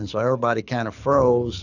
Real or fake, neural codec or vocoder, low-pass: fake; codec, 24 kHz, 6 kbps, HILCodec; 7.2 kHz